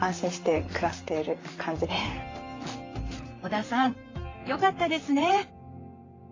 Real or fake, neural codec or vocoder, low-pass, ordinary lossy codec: fake; vocoder, 44.1 kHz, 128 mel bands, Pupu-Vocoder; 7.2 kHz; AAC, 32 kbps